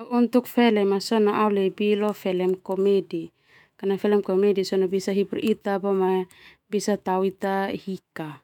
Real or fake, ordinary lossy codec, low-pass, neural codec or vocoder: fake; none; 19.8 kHz; autoencoder, 48 kHz, 128 numbers a frame, DAC-VAE, trained on Japanese speech